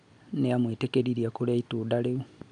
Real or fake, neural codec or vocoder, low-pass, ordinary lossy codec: real; none; 9.9 kHz; none